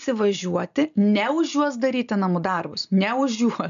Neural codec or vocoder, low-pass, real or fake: none; 7.2 kHz; real